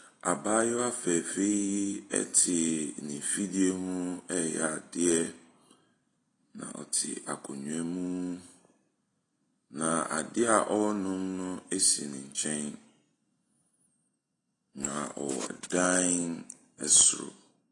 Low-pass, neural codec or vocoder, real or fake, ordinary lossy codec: 10.8 kHz; none; real; AAC, 32 kbps